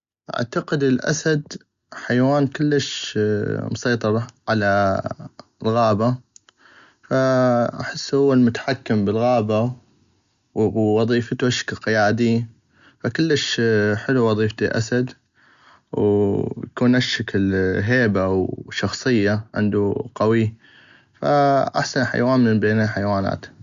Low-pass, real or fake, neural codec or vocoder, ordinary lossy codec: 7.2 kHz; real; none; Opus, 64 kbps